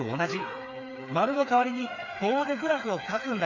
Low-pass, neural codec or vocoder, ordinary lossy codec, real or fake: 7.2 kHz; codec, 16 kHz, 4 kbps, FreqCodec, smaller model; none; fake